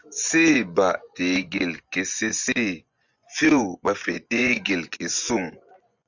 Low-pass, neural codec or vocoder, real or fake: 7.2 kHz; vocoder, 24 kHz, 100 mel bands, Vocos; fake